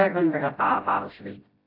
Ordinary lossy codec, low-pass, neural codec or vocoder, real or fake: AAC, 32 kbps; 5.4 kHz; codec, 16 kHz, 0.5 kbps, FreqCodec, smaller model; fake